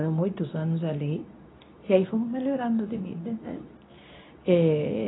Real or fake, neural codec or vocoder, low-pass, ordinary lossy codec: fake; codec, 24 kHz, 0.9 kbps, WavTokenizer, medium speech release version 2; 7.2 kHz; AAC, 16 kbps